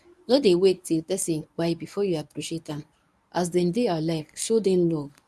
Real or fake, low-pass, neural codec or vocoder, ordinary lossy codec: fake; none; codec, 24 kHz, 0.9 kbps, WavTokenizer, medium speech release version 1; none